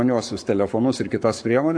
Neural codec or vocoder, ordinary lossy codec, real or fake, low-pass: codec, 44.1 kHz, 7.8 kbps, Pupu-Codec; AAC, 64 kbps; fake; 9.9 kHz